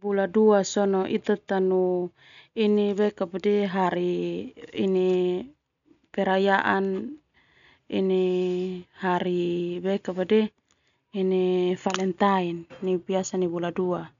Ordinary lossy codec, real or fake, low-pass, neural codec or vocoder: none; real; 7.2 kHz; none